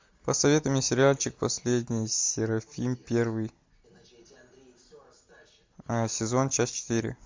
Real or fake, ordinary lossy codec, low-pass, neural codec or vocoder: real; MP3, 64 kbps; 7.2 kHz; none